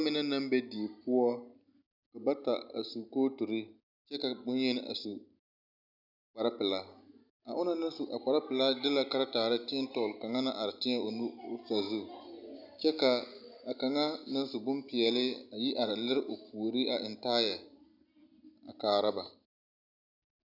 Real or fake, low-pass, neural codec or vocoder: real; 5.4 kHz; none